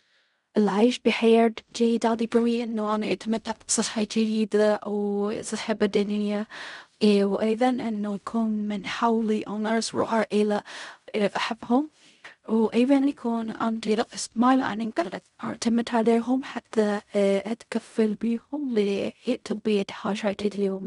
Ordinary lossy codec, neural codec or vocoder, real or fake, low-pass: MP3, 96 kbps; codec, 16 kHz in and 24 kHz out, 0.4 kbps, LongCat-Audio-Codec, fine tuned four codebook decoder; fake; 10.8 kHz